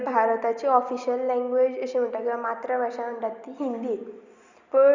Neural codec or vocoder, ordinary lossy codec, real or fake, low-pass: none; Opus, 64 kbps; real; 7.2 kHz